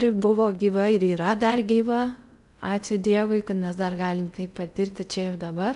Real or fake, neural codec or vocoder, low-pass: fake; codec, 16 kHz in and 24 kHz out, 0.6 kbps, FocalCodec, streaming, 2048 codes; 10.8 kHz